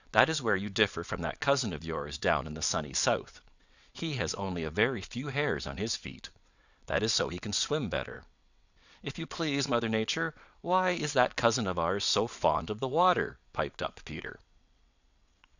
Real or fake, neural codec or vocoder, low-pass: fake; vocoder, 22.05 kHz, 80 mel bands, WaveNeXt; 7.2 kHz